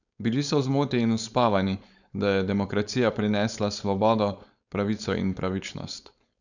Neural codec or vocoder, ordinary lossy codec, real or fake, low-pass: codec, 16 kHz, 4.8 kbps, FACodec; none; fake; 7.2 kHz